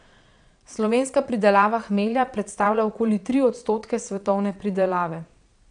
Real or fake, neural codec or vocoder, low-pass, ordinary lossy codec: fake; vocoder, 22.05 kHz, 80 mel bands, Vocos; 9.9 kHz; none